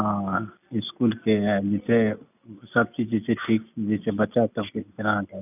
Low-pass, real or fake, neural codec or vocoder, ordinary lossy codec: 3.6 kHz; real; none; none